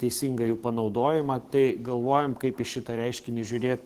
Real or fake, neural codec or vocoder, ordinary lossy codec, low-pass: fake; codec, 44.1 kHz, 7.8 kbps, DAC; Opus, 16 kbps; 14.4 kHz